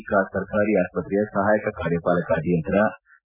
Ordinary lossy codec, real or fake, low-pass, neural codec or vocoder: none; real; 3.6 kHz; none